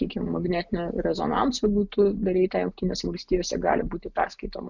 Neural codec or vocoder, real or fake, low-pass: none; real; 7.2 kHz